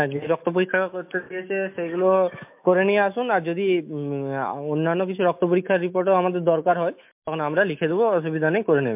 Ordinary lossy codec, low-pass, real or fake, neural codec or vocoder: MP3, 32 kbps; 3.6 kHz; fake; autoencoder, 48 kHz, 128 numbers a frame, DAC-VAE, trained on Japanese speech